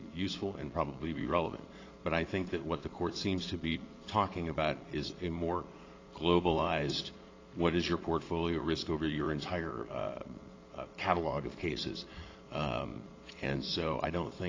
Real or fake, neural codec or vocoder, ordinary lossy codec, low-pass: fake; vocoder, 22.05 kHz, 80 mel bands, Vocos; AAC, 32 kbps; 7.2 kHz